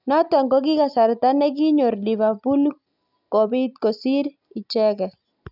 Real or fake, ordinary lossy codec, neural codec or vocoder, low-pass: real; none; none; 5.4 kHz